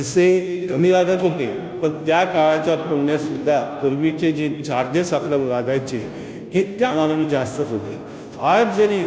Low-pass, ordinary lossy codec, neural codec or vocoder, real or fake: none; none; codec, 16 kHz, 0.5 kbps, FunCodec, trained on Chinese and English, 25 frames a second; fake